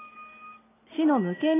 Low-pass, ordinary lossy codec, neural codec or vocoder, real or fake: 3.6 kHz; AAC, 16 kbps; none; real